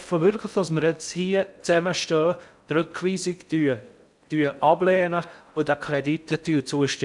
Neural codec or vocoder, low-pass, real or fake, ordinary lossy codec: codec, 16 kHz in and 24 kHz out, 0.6 kbps, FocalCodec, streaming, 4096 codes; 10.8 kHz; fake; none